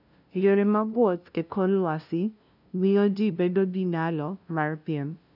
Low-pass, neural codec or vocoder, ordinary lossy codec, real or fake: 5.4 kHz; codec, 16 kHz, 0.5 kbps, FunCodec, trained on LibriTTS, 25 frames a second; none; fake